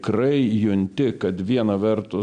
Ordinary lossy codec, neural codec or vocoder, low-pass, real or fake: MP3, 64 kbps; none; 9.9 kHz; real